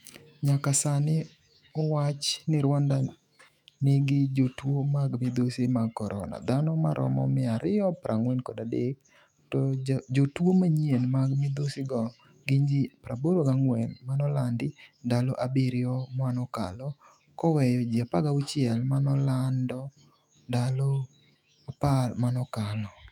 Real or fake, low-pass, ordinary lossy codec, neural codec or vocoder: fake; 19.8 kHz; none; autoencoder, 48 kHz, 128 numbers a frame, DAC-VAE, trained on Japanese speech